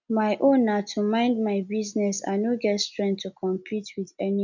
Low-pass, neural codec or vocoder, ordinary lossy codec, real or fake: 7.2 kHz; none; none; real